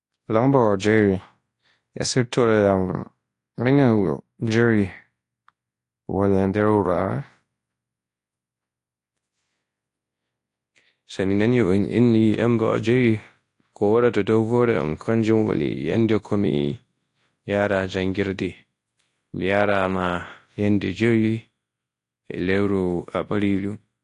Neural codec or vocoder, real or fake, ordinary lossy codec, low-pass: codec, 24 kHz, 0.9 kbps, WavTokenizer, large speech release; fake; AAC, 48 kbps; 10.8 kHz